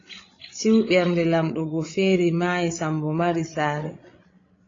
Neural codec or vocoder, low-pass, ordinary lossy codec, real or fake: codec, 16 kHz, 16 kbps, FreqCodec, larger model; 7.2 kHz; AAC, 32 kbps; fake